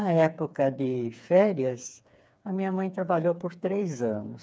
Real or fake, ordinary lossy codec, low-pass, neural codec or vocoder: fake; none; none; codec, 16 kHz, 4 kbps, FreqCodec, smaller model